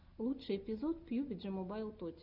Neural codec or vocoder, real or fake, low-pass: none; real; 5.4 kHz